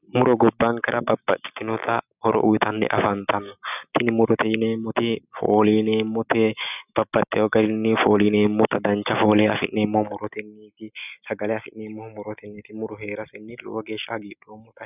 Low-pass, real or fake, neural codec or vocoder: 3.6 kHz; real; none